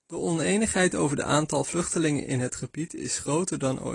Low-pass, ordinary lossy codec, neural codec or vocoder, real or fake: 10.8 kHz; AAC, 32 kbps; none; real